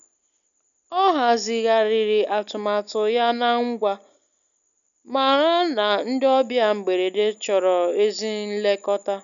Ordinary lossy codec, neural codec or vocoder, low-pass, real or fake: none; none; 7.2 kHz; real